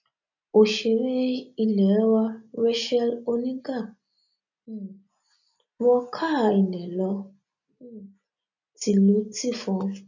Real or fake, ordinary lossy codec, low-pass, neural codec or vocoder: real; none; 7.2 kHz; none